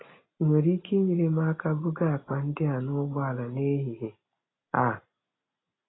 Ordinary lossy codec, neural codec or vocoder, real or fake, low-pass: AAC, 16 kbps; none; real; 7.2 kHz